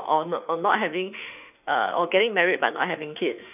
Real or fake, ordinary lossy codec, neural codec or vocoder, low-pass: fake; none; autoencoder, 48 kHz, 32 numbers a frame, DAC-VAE, trained on Japanese speech; 3.6 kHz